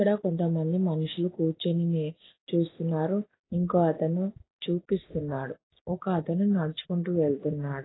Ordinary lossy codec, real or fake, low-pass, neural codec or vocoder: AAC, 16 kbps; real; 7.2 kHz; none